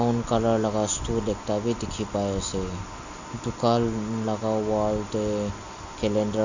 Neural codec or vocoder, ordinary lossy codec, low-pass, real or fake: none; Opus, 64 kbps; 7.2 kHz; real